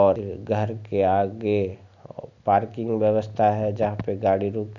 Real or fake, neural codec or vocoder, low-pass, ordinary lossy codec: real; none; 7.2 kHz; none